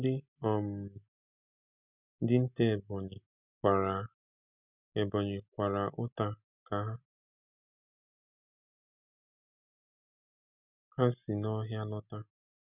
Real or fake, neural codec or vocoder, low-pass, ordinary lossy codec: real; none; 3.6 kHz; none